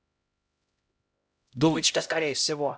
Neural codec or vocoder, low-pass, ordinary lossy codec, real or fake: codec, 16 kHz, 0.5 kbps, X-Codec, HuBERT features, trained on LibriSpeech; none; none; fake